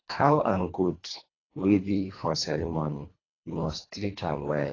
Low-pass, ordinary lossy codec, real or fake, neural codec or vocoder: 7.2 kHz; AAC, 32 kbps; fake; codec, 24 kHz, 1.5 kbps, HILCodec